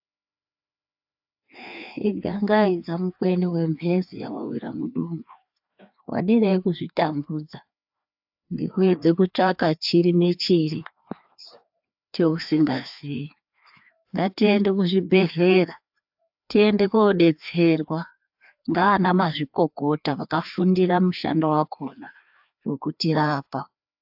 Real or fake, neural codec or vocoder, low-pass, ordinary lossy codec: fake; codec, 16 kHz, 2 kbps, FreqCodec, larger model; 5.4 kHz; AAC, 48 kbps